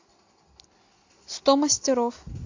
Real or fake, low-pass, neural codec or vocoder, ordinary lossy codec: real; 7.2 kHz; none; AAC, 48 kbps